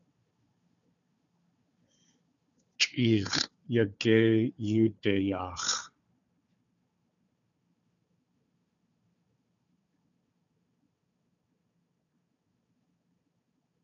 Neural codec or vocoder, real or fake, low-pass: codec, 16 kHz, 4 kbps, FunCodec, trained on Chinese and English, 50 frames a second; fake; 7.2 kHz